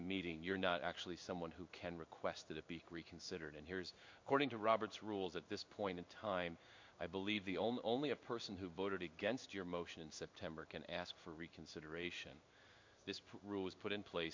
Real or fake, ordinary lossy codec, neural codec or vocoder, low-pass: fake; MP3, 48 kbps; codec, 16 kHz in and 24 kHz out, 1 kbps, XY-Tokenizer; 7.2 kHz